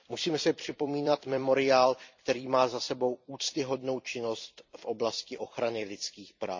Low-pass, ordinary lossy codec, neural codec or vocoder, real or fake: 7.2 kHz; MP3, 64 kbps; vocoder, 44.1 kHz, 128 mel bands every 256 samples, BigVGAN v2; fake